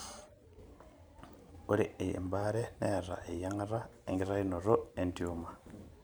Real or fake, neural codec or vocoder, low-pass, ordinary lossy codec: fake; vocoder, 44.1 kHz, 128 mel bands every 512 samples, BigVGAN v2; none; none